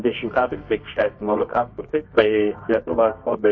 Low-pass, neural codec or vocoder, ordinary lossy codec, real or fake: 7.2 kHz; codec, 24 kHz, 0.9 kbps, WavTokenizer, medium music audio release; MP3, 32 kbps; fake